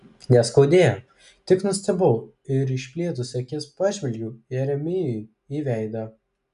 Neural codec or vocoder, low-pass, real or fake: none; 10.8 kHz; real